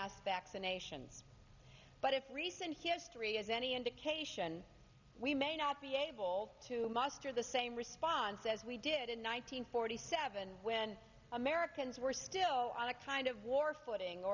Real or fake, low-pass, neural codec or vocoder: real; 7.2 kHz; none